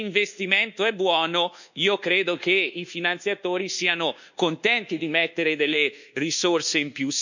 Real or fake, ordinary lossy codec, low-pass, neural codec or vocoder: fake; none; 7.2 kHz; codec, 24 kHz, 1.2 kbps, DualCodec